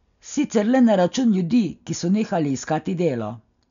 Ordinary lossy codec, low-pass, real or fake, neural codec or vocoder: none; 7.2 kHz; real; none